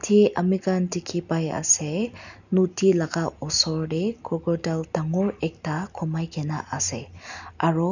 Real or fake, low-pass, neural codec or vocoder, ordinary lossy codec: real; 7.2 kHz; none; none